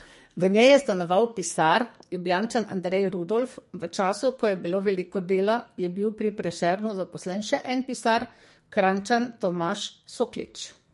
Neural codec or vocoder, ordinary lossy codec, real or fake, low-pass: codec, 44.1 kHz, 2.6 kbps, SNAC; MP3, 48 kbps; fake; 14.4 kHz